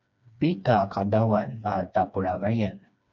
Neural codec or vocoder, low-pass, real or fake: codec, 16 kHz, 2 kbps, FreqCodec, smaller model; 7.2 kHz; fake